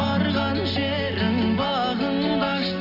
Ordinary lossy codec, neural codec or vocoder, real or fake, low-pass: AAC, 48 kbps; none; real; 5.4 kHz